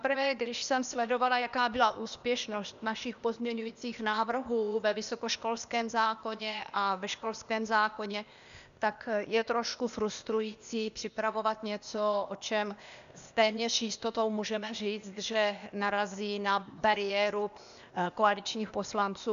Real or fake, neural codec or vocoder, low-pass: fake; codec, 16 kHz, 0.8 kbps, ZipCodec; 7.2 kHz